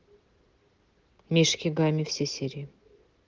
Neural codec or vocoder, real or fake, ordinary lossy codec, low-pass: none; real; Opus, 16 kbps; 7.2 kHz